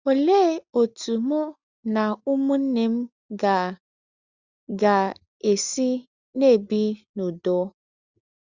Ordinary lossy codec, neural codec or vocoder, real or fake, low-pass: Opus, 64 kbps; none; real; 7.2 kHz